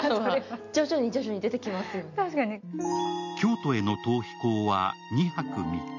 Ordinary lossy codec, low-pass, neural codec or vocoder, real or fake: none; 7.2 kHz; none; real